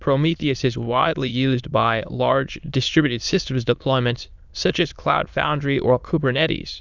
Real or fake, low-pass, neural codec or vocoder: fake; 7.2 kHz; autoencoder, 22.05 kHz, a latent of 192 numbers a frame, VITS, trained on many speakers